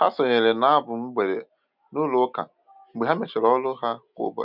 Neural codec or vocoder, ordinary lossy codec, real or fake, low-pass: none; none; real; 5.4 kHz